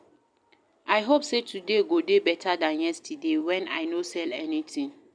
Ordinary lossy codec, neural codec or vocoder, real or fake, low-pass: none; none; real; 9.9 kHz